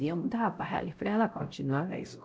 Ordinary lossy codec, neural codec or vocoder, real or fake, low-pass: none; codec, 16 kHz, 0.5 kbps, X-Codec, WavLM features, trained on Multilingual LibriSpeech; fake; none